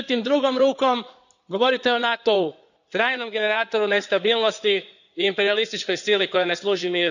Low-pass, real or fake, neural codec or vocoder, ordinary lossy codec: 7.2 kHz; fake; codec, 16 kHz in and 24 kHz out, 2.2 kbps, FireRedTTS-2 codec; none